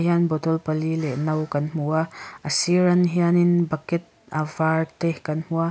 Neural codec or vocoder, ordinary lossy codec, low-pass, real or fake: none; none; none; real